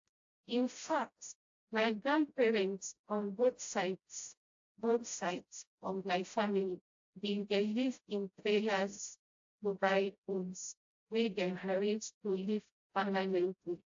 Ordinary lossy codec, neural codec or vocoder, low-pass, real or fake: AAC, 48 kbps; codec, 16 kHz, 0.5 kbps, FreqCodec, smaller model; 7.2 kHz; fake